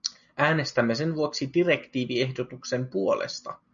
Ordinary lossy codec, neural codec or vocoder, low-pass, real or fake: MP3, 96 kbps; none; 7.2 kHz; real